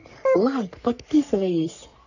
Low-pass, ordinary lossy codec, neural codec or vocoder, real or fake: 7.2 kHz; none; codec, 44.1 kHz, 3.4 kbps, Pupu-Codec; fake